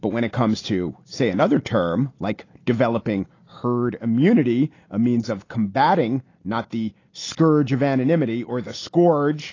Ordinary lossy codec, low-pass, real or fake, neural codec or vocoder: AAC, 32 kbps; 7.2 kHz; real; none